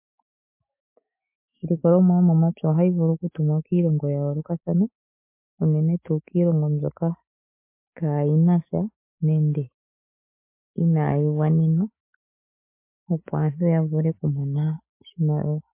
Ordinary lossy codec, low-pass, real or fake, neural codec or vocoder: MP3, 24 kbps; 3.6 kHz; fake; autoencoder, 48 kHz, 128 numbers a frame, DAC-VAE, trained on Japanese speech